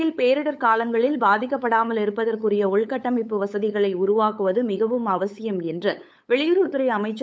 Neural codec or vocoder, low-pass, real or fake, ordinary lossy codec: codec, 16 kHz, 8 kbps, FunCodec, trained on LibriTTS, 25 frames a second; none; fake; none